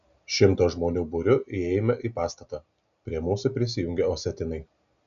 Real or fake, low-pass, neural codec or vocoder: real; 7.2 kHz; none